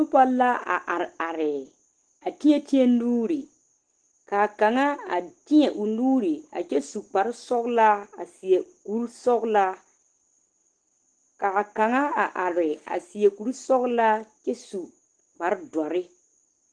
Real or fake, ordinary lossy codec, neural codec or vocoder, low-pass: real; Opus, 16 kbps; none; 9.9 kHz